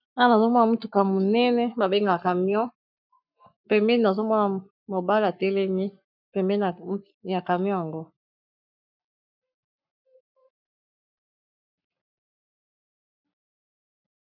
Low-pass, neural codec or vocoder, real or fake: 5.4 kHz; codec, 44.1 kHz, 7.8 kbps, Pupu-Codec; fake